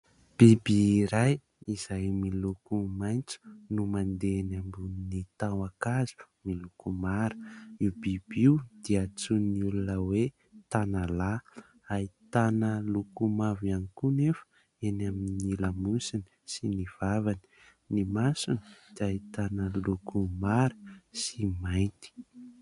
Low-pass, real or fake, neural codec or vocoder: 10.8 kHz; real; none